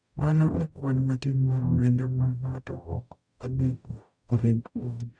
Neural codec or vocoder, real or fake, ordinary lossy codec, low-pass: codec, 44.1 kHz, 0.9 kbps, DAC; fake; none; 9.9 kHz